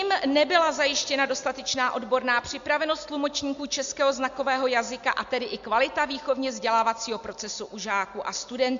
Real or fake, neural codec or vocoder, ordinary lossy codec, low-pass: real; none; MP3, 64 kbps; 7.2 kHz